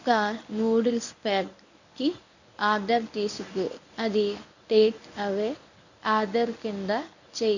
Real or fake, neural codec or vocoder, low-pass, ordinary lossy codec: fake; codec, 24 kHz, 0.9 kbps, WavTokenizer, medium speech release version 1; 7.2 kHz; none